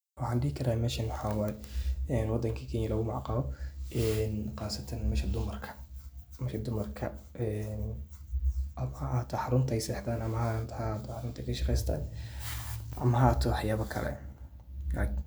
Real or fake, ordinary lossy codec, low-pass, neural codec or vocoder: real; none; none; none